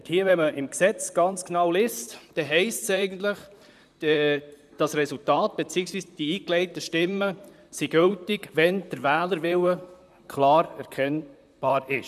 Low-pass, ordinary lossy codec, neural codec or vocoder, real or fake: 14.4 kHz; none; vocoder, 44.1 kHz, 128 mel bands, Pupu-Vocoder; fake